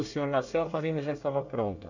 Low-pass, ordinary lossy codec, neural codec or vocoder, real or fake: 7.2 kHz; none; codec, 24 kHz, 1 kbps, SNAC; fake